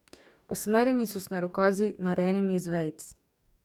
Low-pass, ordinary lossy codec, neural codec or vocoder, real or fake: 19.8 kHz; none; codec, 44.1 kHz, 2.6 kbps, DAC; fake